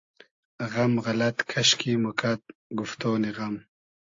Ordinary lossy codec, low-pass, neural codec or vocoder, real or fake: AAC, 48 kbps; 7.2 kHz; none; real